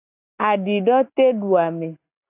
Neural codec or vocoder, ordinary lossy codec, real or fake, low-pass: none; AAC, 24 kbps; real; 3.6 kHz